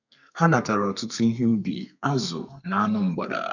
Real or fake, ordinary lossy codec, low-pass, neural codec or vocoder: fake; none; 7.2 kHz; codec, 32 kHz, 1.9 kbps, SNAC